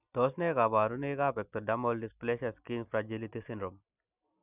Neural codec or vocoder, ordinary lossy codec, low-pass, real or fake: none; none; 3.6 kHz; real